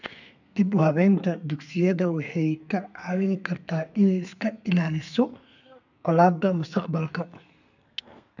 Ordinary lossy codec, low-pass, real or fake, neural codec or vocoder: none; 7.2 kHz; fake; codec, 32 kHz, 1.9 kbps, SNAC